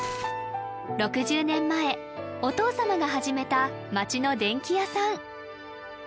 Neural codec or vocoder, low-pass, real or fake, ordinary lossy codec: none; none; real; none